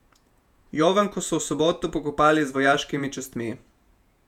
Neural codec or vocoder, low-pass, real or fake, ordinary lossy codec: vocoder, 48 kHz, 128 mel bands, Vocos; 19.8 kHz; fake; none